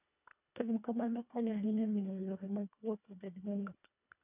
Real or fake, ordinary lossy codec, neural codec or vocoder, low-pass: fake; none; codec, 24 kHz, 1.5 kbps, HILCodec; 3.6 kHz